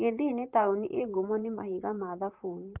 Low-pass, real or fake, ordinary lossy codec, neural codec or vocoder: 3.6 kHz; fake; Opus, 32 kbps; vocoder, 44.1 kHz, 128 mel bands, Pupu-Vocoder